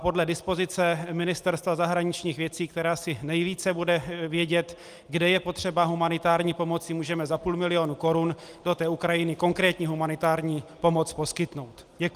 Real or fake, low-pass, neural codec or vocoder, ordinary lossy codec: real; 14.4 kHz; none; Opus, 32 kbps